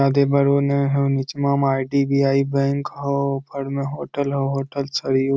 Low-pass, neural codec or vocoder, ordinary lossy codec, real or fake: none; none; none; real